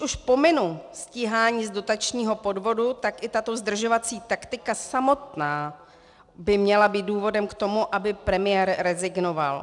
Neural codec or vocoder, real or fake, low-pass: none; real; 10.8 kHz